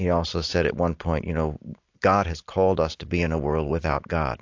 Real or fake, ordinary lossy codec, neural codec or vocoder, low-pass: real; AAC, 48 kbps; none; 7.2 kHz